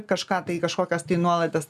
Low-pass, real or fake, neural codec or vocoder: 14.4 kHz; real; none